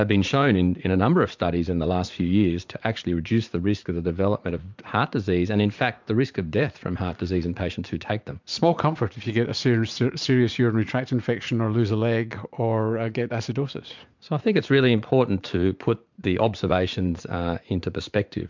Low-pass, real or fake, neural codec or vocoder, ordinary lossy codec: 7.2 kHz; fake; vocoder, 44.1 kHz, 80 mel bands, Vocos; MP3, 64 kbps